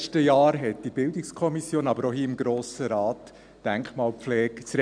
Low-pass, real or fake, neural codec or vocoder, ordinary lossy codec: 9.9 kHz; real; none; none